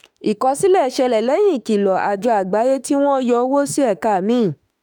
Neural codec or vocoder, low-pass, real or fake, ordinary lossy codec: autoencoder, 48 kHz, 32 numbers a frame, DAC-VAE, trained on Japanese speech; none; fake; none